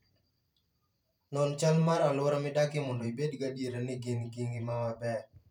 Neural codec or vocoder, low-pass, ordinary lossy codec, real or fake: vocoder, 44.1 kHz, 128 mel bands every 512 samples, BigVGAN v2; 19.8 kHz; none; fake